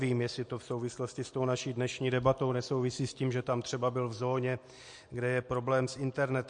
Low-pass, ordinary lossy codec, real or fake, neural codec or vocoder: 9.9 kHz; MP3, 48 kbps; real; none